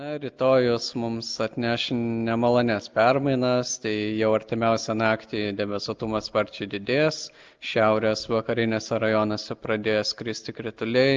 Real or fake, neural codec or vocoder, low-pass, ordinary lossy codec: real; none; 7.2 kHz; Opus, 24 kbps